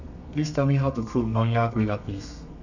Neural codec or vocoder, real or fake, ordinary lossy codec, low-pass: codec, 32 kHz, 1.9 kbps, SNAC; fake; none; 7.2 kHz